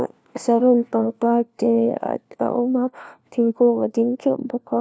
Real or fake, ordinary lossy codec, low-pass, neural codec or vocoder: fake; none; none; codec, 16 kHz, 1 kbps, FunCodec, trained on LibriTTS, 50 frames a second